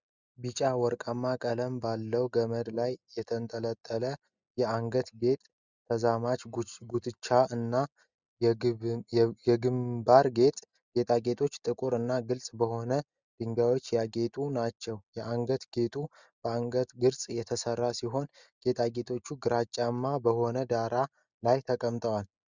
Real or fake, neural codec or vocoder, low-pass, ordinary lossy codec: real; none; 7.2 kHz; Opus, 64 kbps